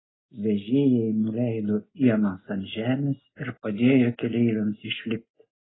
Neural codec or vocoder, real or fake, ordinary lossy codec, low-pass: none; real; AAC, 16 kbps; 7.2 kHz